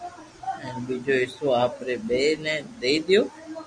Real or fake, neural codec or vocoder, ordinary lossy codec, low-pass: real; none; MP3, 64 kbps; 9.9 kHz